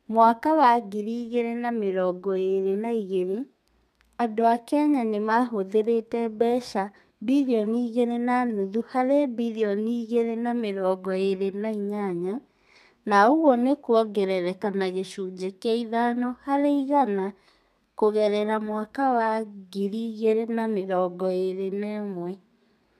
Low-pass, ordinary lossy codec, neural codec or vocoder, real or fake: 14.4 kHz; none; codec, 32 kHz, 1.9 kbps, SNAC; fake